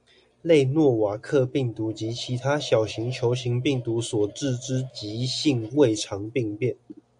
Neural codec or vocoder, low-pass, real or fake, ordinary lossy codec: none; 9.9 kHz; real; MP3, 96 kbps